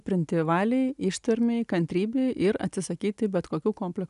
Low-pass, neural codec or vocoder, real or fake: 10.8 kHz; none; real